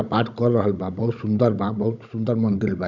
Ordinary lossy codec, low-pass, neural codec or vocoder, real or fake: none; 7.2 kHz; codec, 16 kHz, 16 kbps, FunCodec, trained on Chinese and English, 50 frames a second; fake